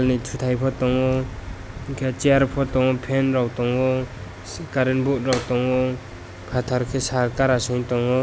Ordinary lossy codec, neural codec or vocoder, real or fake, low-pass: none; none; real; none